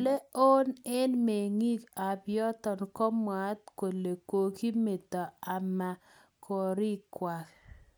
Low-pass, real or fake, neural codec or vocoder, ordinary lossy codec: none; real; none; none